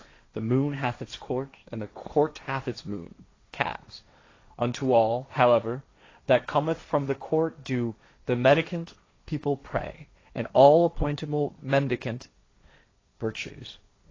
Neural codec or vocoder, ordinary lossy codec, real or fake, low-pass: codec, 16 kHz, 1.1 kbps, Voila-Tokenizer; AAC, 32 kbps; fake; 7.2 kHz